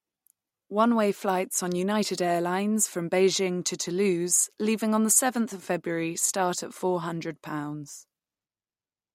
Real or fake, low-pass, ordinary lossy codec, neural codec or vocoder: real; 19.8 kHz; MP3, 64 kbps; none